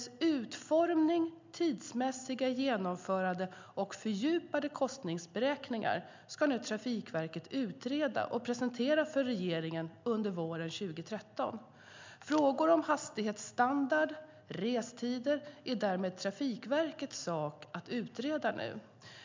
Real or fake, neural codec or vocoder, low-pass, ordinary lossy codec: real; none; 7.2 kHz; MP3, 64 kbps